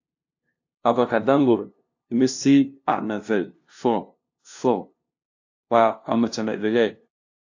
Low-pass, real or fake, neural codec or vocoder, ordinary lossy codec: 7.2 kHz; fake; codec, 16 kHz, 0.5 kbps, FunCodec, trained on LibriTTS, 25 frames a second; AAC, 48 kbps